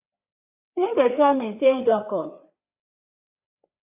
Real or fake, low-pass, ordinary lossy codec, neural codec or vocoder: fake; 3.6 kHz; AAC, 32 kbps; codec, 16 kHz, 4 kbps, FreqCodec, larger model